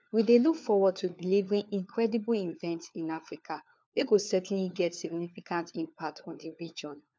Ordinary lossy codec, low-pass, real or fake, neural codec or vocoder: none; none; fake; codec, 16 kHz, 4 kbps, FunCodec, trained on LibriTTS, 50 frames a second